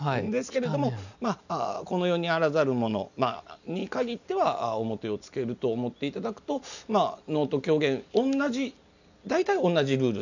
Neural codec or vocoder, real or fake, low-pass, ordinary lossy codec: none; real; 7.2 kHz; none